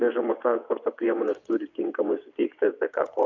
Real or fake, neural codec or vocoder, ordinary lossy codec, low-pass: fake; vocoder, 22.05 kHz, 80 mel bands, WaveNeXt; MP3, 64 kbps; 7.2 kHz